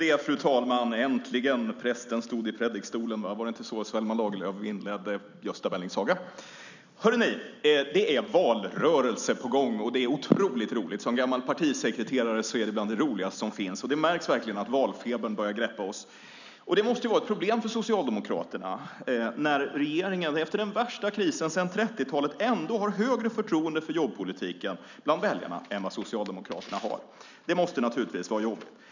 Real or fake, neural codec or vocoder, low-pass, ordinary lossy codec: real; none; 7.2 kHz; none